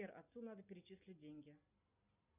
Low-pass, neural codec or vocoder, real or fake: 3.6 kHz; none; real